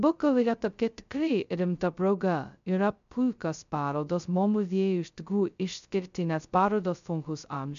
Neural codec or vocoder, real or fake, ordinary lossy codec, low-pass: codec, 16 kHz, 0.2 kbps, FocalCodec; fake; MP3, 64 kbps; 7.2 kHz